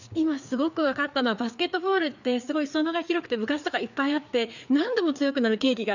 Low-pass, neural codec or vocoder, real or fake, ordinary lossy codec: 7.2 kHz; codec, 16 kHz, 4 kbps, FreqCodec, larger model; fake; none